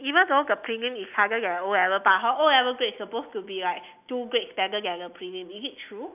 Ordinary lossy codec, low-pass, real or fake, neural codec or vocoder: none; 3.6 kHz; real; none